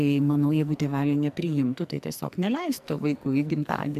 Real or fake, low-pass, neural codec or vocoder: fake; 14.4 kHz; codec, 44.1 kHz, 2.6 kbps, SNAC